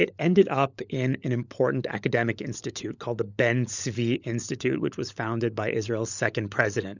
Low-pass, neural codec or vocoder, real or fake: 7.2 kHz; codec, 16 kHz, 16 kbps, FunCodec, trained on LibriTTS, 50 frames a second; fake